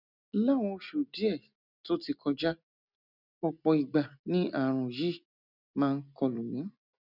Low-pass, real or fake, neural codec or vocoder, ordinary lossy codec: 5.4 kHz; real; none; none